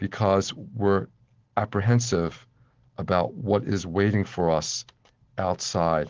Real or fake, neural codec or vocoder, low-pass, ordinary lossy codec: real; none; 7.2 kHz; Opus, 16 kbps